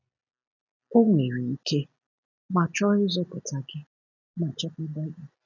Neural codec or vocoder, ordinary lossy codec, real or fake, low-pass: none; none; real; 7.2 kHz